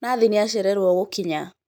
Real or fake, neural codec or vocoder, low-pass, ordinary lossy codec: real; none; none; none